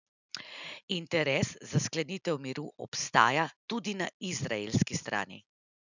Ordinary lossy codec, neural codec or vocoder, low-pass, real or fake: none; none; 7.2 kHz; real